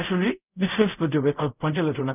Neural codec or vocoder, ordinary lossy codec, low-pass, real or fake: codec, 24 kHz, 0.5 kbps, DualCodec; none; 3.6 kHz; fake